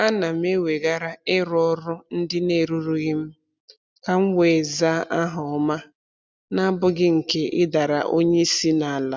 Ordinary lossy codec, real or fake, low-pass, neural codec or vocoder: none; real; none; none